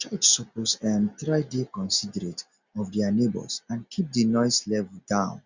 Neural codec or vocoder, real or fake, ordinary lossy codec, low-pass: none; real; Opus, 64 kbps; 7.2 kHz